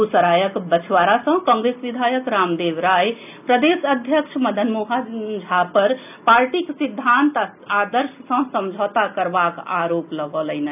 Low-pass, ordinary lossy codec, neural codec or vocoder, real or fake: 3.6 kHz; none; none; real